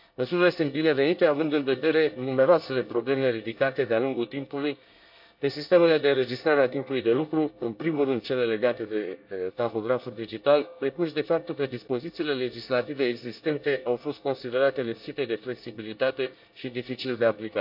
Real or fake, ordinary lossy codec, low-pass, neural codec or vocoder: fake; AAC, 48 kbps; 5.4 kHz; codec, 24 kHz, 1 kbps, SNAC